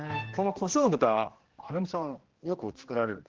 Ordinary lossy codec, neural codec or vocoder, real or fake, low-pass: Opus, 16 kbps; codec, 16 kHz, 1 kbps, X-Codec, HuBERT features, trained on general audio; fake; 7.2 kHz